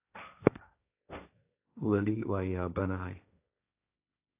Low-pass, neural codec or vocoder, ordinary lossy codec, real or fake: 3.6 kHz; codec, 16 kHz, 1.1 kbps, Voila-Tokenizer; AAC, 32 kbps; fake